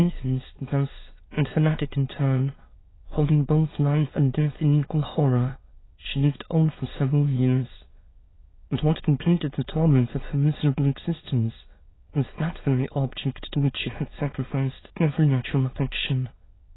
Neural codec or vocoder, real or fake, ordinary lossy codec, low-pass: autoencoder, 22.05 kHz, a latent of 192 numbers a frame, VITS, trained on many speakers; fake; AAC, 16 kbps; 7.2 kHz